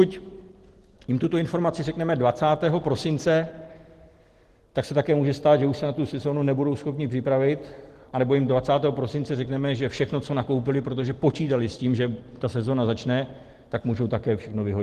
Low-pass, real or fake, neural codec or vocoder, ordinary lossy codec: 14.4 kHz; real; none; Opus, 16 kbps